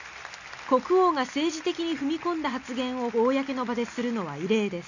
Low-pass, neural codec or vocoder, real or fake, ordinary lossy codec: 7.2 kHz; none; real; none